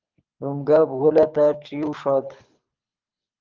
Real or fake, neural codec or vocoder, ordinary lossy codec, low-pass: fake; codec, 24 kHz, 6 kbps, HILCodec; Opus, 24 kbps; 7.2 kHz